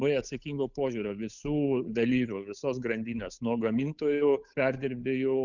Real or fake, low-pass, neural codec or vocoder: fake; 7.2 kHz; codec, 16 kHz, 8 kbps, FunCodec, trained on Chinese and English, 25 frames a second